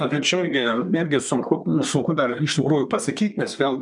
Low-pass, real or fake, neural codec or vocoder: 10.8 kHz; fake; codec, 24 kHz, 1 kbps, SNAC